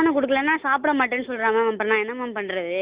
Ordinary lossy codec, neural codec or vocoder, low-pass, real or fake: Opus, 64 kbps; none; 3.6 kHz; real